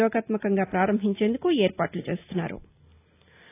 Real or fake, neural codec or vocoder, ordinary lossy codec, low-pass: real; none; AAC, 24 kbps; 3.6 kHz